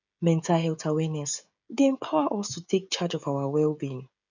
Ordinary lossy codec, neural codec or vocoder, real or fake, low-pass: none; codec, 16 kHz, 16 kbps, FreqCodec, smaller model; fake; 7.2 kHz